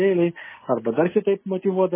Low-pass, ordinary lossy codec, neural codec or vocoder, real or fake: 3.6 kHz; MP3, 16 kbps; none; real